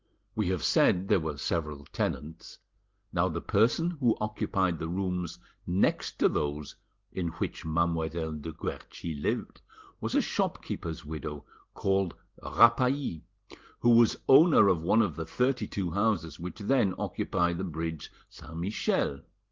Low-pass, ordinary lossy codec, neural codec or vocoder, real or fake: 7.2 kHz; Opus, 16 kbps; none; real